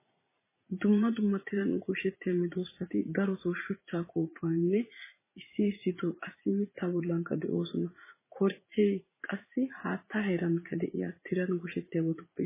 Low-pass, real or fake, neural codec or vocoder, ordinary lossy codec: 3.6 kHz; fake; vocoder, 44.1 kHz, 80 mel bands, Vocos; MP3, 16 kbps